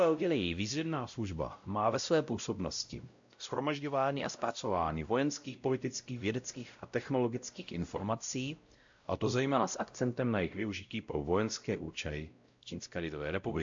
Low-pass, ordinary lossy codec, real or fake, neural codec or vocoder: 7.2 kHz; AAC, 64 kbps; fake; codec, 16 kHz, 0.5 kbps, X-Codec, WavLM features, trained on Multilingual LibriSpeech